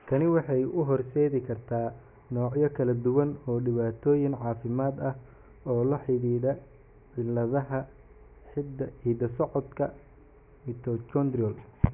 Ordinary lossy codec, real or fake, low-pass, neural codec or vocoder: none; real; 3.6 kHz; none